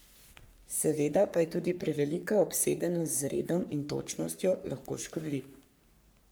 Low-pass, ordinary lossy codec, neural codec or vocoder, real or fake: none; none; codec, 44.1 kHz, 3.4 kbps, Pupu-Codec; fake